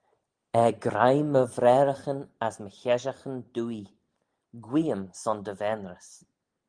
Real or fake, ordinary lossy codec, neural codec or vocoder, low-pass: real; Opus, 24 kbps; none; 9.9 kHz